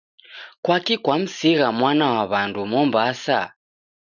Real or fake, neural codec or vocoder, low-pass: real; none; 7.2 kHz